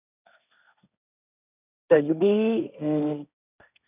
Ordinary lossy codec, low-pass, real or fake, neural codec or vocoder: none; 3.6 kHz; fake; codec, 16 kHz, 1.1 kbps, Voila-Tokenizer